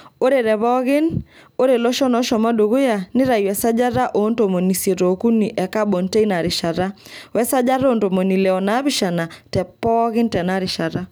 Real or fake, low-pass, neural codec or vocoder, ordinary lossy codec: real; none; none; none